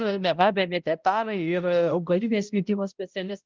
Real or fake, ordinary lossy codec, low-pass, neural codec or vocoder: fake; Opus, 32 kbps; 7.2 kHz; codec, 16 kHz, 0.5 kbps, X-Codec, HuBERT features, trained on balanced general audio